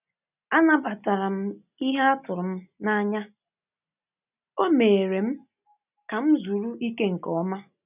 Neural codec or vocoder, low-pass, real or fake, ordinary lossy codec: none; 3.6 kHz; real; none